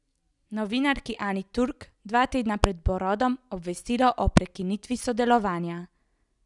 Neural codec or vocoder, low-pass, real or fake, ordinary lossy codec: none; 10.8 kHz; real; none